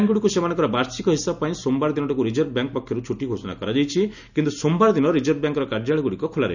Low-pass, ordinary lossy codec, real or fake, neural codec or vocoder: 7.2 kHz; none; real; none